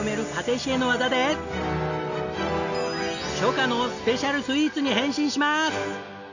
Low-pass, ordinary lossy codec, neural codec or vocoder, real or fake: 7.2 kHz; none; none; real